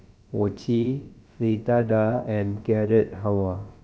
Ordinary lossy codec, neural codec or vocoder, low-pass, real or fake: none; codec, 16 kHz, about 1 kbps, DyCAST, with the encoder's durations; none; fake